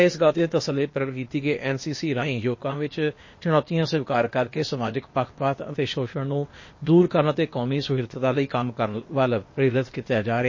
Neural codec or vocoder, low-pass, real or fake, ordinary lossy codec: codec, 16 kHz, 0.8 kbps, ZipCodec; 7.2 kHz; fake; MP3, 32 kbps